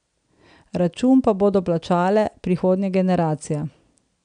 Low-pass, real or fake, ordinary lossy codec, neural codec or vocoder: 9.9 kHz; real; none; none